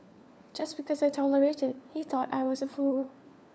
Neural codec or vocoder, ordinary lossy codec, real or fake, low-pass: codec, 16 kHz, 4 kbps, FunCodec, trained on LibriTTS, 50 frames a second; none; fake; none